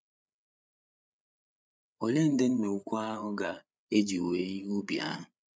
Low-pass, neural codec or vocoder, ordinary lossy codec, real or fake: none; codec, 16 kHz, 8 kbps, FreqCodec, larger model; none; fake